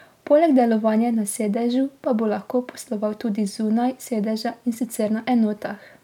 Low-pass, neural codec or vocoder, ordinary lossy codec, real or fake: 19.8 kHz; none; none; real